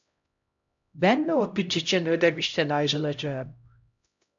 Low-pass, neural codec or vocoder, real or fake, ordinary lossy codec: 7.2 kHz; codec, 16 kHz, 0.5 kbps, X-Codec, HuBERT features, trained on LibriSpeech; fake; AAC, 64 kbps